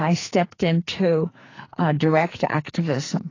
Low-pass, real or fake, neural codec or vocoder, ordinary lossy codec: 7.2 kHz; fake; codec, 44.1 kHz, 2.6 kbps, SNAC; AAC, 32 kbps